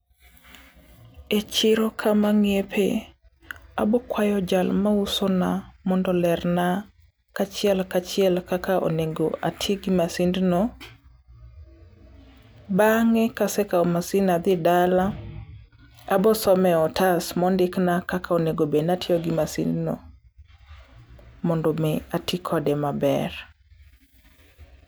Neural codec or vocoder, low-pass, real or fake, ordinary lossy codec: none; none; real; none